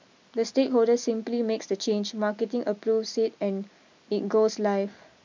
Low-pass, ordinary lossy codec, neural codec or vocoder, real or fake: 7.2 kHz; MP3, 64 kbps; none; real